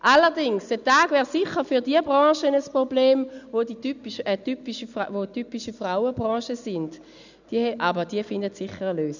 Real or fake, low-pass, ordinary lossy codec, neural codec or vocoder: real; 7.2 kHz; none; none